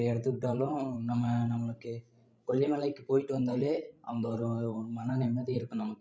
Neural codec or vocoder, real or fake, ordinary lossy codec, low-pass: codec, 16 kHz, 16 kbps, FreqCodec, larger model; fake; none; none